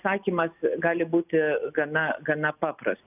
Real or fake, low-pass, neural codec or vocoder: real; 3.6 kHz; none